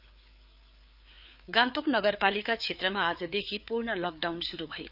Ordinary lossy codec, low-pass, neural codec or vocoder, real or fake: none; 5.4 kHz; codec, 16 kHz, 4 kbps, FreqCodec, larger model; fake